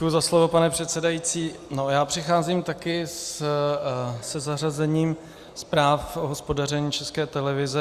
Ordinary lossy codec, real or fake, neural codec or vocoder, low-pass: Opus, 64 kbps; real; none; 14.4 kHz